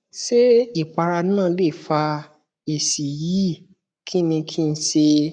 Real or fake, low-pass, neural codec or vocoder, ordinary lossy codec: fake; 9.9 kHz; codec, 44.1 kHz, 7.8 kbps, Pupu-Codec; none